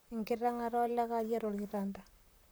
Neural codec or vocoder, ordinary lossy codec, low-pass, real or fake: vocoder, 44.1 kHz, 128 mel bands, Pupu-Vocoder; none; none; fake